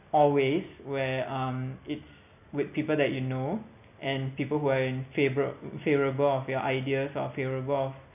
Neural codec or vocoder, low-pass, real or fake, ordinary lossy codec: none; 3.6 kHz; real; none